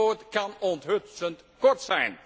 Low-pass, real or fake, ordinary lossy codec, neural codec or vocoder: none; real; none; none